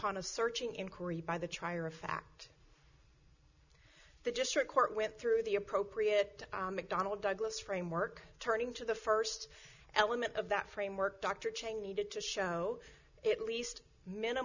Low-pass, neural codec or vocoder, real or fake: 7.2 kHz; none; real